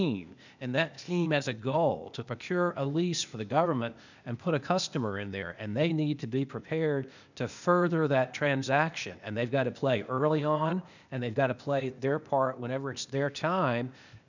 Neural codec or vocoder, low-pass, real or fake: codec, 16 kHz, 0.8 kbps, ZipCodec; 7.2 kHz; fake